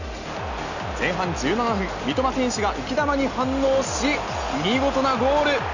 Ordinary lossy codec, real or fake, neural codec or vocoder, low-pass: none; real; none; 7.2 kHz